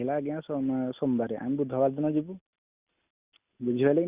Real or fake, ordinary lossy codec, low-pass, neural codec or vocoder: real; Opus, 64 kbps; 3.6 kHz; none